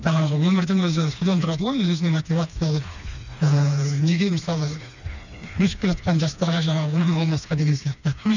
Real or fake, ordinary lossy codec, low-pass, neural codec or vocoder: fake; none; 7.2 kHz; codec, 16 kHz, 2 kbps, FreqCodec, smaller model